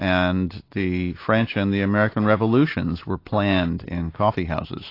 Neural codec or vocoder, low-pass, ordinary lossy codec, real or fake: none; 5.4 kHz; AAC, 32 kbps; real